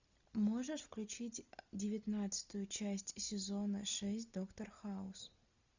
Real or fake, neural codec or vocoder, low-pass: real; none; 7.2 kHz